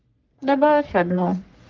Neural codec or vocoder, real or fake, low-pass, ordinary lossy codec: codec, 44.1 kHz, 3.4 kbps, Pupu-Codec; fake; 7.2 kHz; Opus, 32 kbps